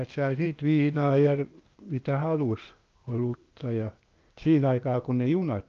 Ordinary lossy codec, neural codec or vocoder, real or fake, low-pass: Opus, 24 kbps; codec, 16 kHz, 0.8 kbps, ZipCodec; fake; 7.2 kHz